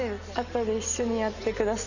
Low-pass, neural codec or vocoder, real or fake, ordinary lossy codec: 7.2 kHz; none; real; none